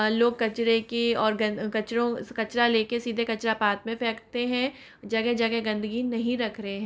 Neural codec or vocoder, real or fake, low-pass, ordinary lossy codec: none; real; none; none